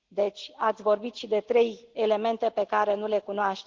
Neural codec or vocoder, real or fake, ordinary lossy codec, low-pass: none; real; Opus, 16 kbps; 7.2 kHz